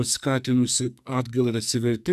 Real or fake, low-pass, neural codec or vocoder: fake; 14.4 kHz; codec, 44.1 kHz, 2.6 kbps, SNAC